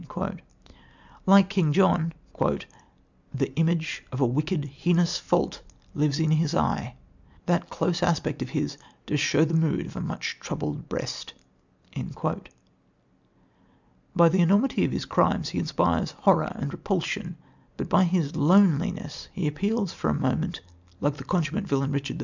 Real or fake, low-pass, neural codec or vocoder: real; 7.2 kHz; none